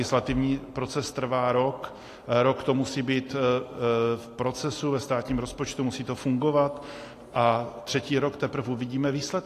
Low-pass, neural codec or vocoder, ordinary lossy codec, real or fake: 14.4 kHz; none; AAC, 48 kbps; real